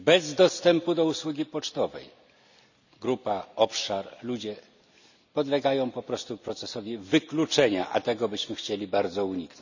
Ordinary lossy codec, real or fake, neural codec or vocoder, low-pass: none; real; none; 7.2 kHz